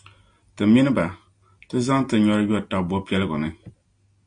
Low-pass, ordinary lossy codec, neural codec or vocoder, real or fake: 9.9 kHz; AAC, 64 kbps; none; real